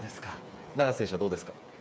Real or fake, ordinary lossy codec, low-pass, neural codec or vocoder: fake; none; none; codec, 16 kHz, 8 kbps, FreqCodec, smaller model